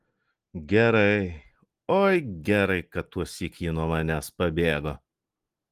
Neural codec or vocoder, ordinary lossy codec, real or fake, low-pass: none; Opus, 24 kbps; real; 14.4 kHz